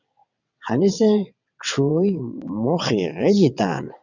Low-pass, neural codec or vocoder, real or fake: 7.2 kHz; vocoder, 22.05 kHz, 80 mel bands, WaveNeXt; fake